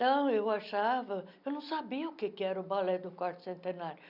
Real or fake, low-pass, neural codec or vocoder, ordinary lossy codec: real; 5.4 kHz; none; none